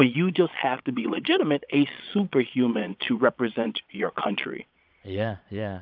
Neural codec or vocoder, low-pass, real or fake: vocoder, 22.05 kHz, 80 mel bands, Vocos; 5.4 kHz; fake